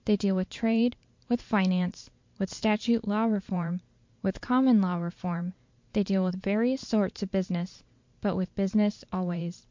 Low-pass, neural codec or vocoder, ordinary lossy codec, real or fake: 7.2 kHz; vocoder, 44.1 kHz, 128 mel bands every 512 samples, BigVGAN v2; MP3, 48 kbps; fake